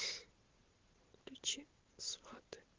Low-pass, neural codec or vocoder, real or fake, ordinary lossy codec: 7.2 kHz; vocoder, 44.1 kHz, 128 mel bands every 512 samples, BigVGAN v2; fake; Opus, 16 kbps